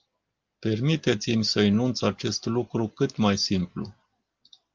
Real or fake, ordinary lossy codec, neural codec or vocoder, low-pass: real; Opus, 32 kbps; none; 7.2 kHz